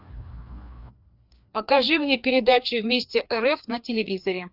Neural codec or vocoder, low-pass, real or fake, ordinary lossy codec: codec, 16 kHz, 2 kbps, FreqCodec, larger model; 5.4 kHz; fake; Opus, 64 kbps